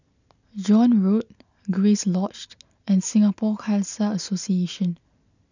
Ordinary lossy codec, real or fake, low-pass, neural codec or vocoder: none; real; 7.2 kHz; none